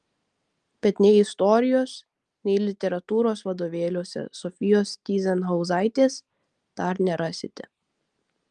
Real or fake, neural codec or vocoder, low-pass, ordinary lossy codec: real; none; 10.8 kHz; Opus, 24 kbps